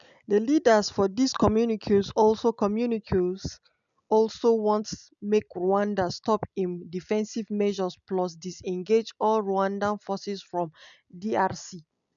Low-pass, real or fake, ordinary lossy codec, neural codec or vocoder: 7.2 kHz; real; none; none